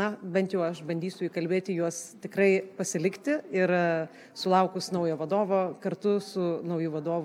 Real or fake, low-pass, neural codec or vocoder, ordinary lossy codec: real; 19.8 kHz; none; MP3, 96 kbps